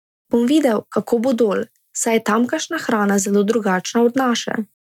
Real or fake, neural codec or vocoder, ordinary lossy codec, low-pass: real; none; none; 19.8 kHz